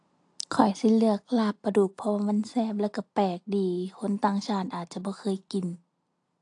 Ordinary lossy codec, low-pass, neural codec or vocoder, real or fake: AAC, 48 kbps; 9.9 kHz; none; real